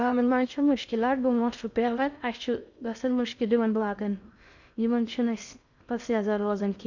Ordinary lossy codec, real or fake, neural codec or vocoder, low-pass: none; fake; codec, 16 kHz in and 24 kHz out, 0.6 kbps, FocalCodec, streaming, 2048 codes; 7.2 kHz